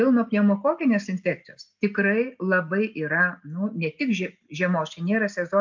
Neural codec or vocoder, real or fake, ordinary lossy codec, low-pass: none; real; MP3, 64 kbps; 7.2 kHz